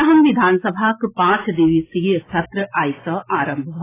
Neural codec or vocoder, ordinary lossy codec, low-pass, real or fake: none; AAC, 16 kbps; 3.6 kHz; real